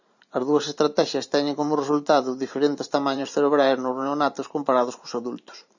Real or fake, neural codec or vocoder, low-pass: fake; vocoder, 44.1 kHz, 128 mel bands every 512 samples, BigVGAN v2; 7.2 kHz